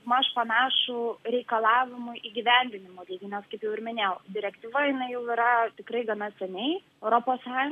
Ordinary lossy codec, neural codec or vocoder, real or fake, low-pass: AAC, 96 kbps; none; real; 14.4 kHz